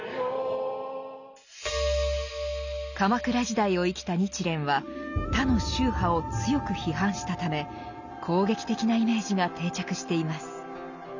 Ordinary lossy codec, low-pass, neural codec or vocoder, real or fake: none; 7.2 kHz; none; real